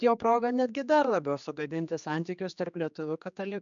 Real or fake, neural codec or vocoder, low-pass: fake; codec, 16 kHz, 2 kbps, X-Codec, HuBERT features, trained on general audio; 7.2 kHz